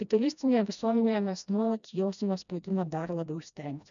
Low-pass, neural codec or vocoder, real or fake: 7.2 kHz; codec, 16 kHz, 1 kbps, FreqCodec, smaller model; fake